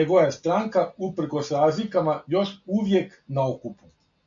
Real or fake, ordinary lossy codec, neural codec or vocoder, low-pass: real; MP3, 64 kbps; none; 7.2 kHz